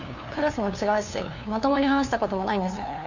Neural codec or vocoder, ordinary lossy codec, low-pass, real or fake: codec, 16 kHz, 2 kbps, FunCodec, trained on LibriTTS, 25 frames a second; none; 7.2 kHz; fake